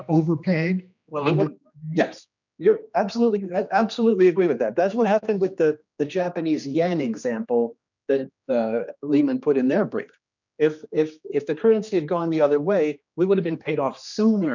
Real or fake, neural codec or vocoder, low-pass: fake; codec, 16 kHz, 2 kbps, X-Codec, HuBERT features, trained on general audio; 7.2 kHz